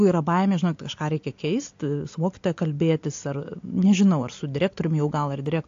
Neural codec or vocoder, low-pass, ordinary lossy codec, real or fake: none; 7.2 kHz; AAC, 48 kbps; real